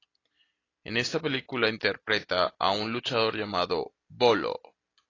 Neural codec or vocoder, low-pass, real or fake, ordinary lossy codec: none; 7.2 kHz; real; AAC, 32 kbps